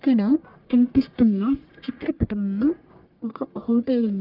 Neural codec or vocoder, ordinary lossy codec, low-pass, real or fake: codec, 44.1 kHz, 1.7 kbps, Pupu-Codec; Opus, 24 kbps; 5.4 kHz; fake